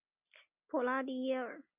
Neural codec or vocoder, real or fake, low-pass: none; real; 3.6 kHz